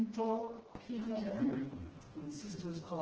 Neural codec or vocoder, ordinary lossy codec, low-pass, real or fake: codec, 16 kHz, 1 kbps, FreqCodec, smaller model; Opus, 16 kbps; 7.2 kHz; fake